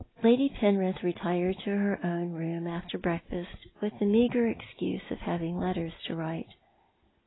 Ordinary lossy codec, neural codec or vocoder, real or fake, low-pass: AAC, 16 kbps; none; real; 7.2 kHz